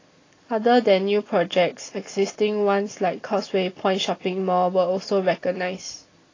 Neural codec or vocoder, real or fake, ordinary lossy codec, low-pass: none; real; AAC, 32 kbps; 7.2 kHz